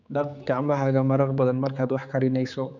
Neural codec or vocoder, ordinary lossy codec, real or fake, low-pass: codec, 16 kHz, 4 kbps, X-Codec, HuBERT features, trained on general audio; none; fake; 7.2 kHz